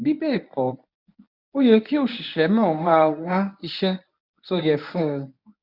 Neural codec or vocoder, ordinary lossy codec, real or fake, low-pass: codec, 24 kHz, 0.9 kbps, WavTokenizer, medium speech release version 1; none; fake; 5.4 kHz